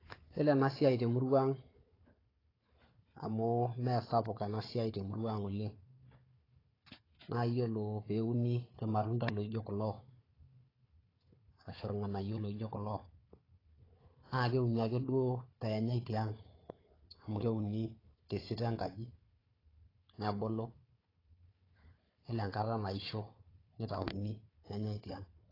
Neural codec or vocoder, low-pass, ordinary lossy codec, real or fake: codec, 16 kHz, 4 kbps, FunCodec, trained on Chinese and English, 50 frames a second; 5.4 kHz; AAC, 24 kbps; fake